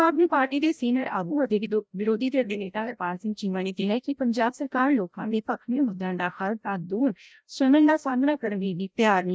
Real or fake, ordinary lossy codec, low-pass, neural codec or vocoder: fake; none; none; codec, 16 kHz, 0.5 kbps, FreqCodec, larger model